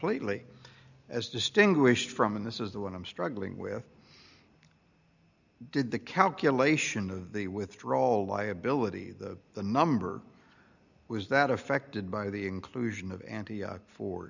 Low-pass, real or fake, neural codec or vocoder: 7.2 kHz; real; none